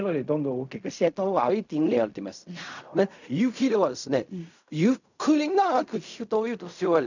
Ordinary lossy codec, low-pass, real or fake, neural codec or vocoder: none; 7.2 kHz; fake; codec, 16 kHz in and 24 kHz out, 0.4 kbps, LongCat-Audio-Codec, fine tuned four codebook decoder